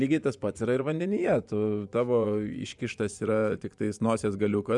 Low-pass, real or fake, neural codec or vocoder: 10.8 kHz; fake; vocoder, 24 kHz, 100 mel bands, Vocos